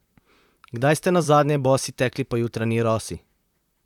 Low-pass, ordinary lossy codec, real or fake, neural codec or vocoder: 19.8 kHz; none; fake; vocoder, 44.1 kHz, 128 mel bands, Pupu-Vocoder